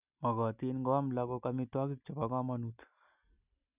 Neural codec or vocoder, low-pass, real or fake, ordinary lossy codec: none; 3.6 kHz; real; none